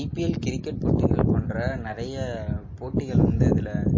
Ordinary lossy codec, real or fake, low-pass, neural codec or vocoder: MP3, 32 kbps; real; 7.2 kHz; none